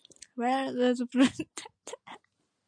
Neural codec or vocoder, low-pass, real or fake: none; 9.9 kHz; real